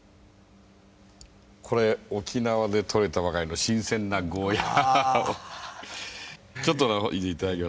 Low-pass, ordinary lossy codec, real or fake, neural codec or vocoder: none; none; real; none